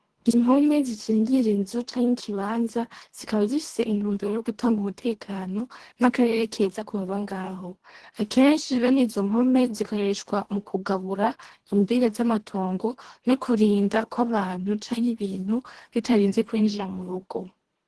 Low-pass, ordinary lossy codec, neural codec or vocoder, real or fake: 10.8 kHz; Opus, 16 kbps; codec, 24 kHz, 1.5 kbps, HILCodec; fake